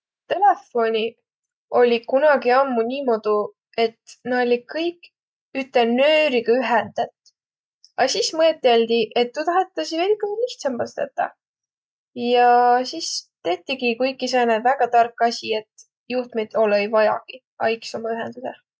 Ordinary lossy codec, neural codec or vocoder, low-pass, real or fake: none; none; none; real